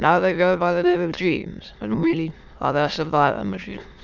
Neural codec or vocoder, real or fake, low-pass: autoencoder, 22.05 kHz, a latent of 192 numbers a frame, VITS, trained on many speakers; fake; 7.2 kHz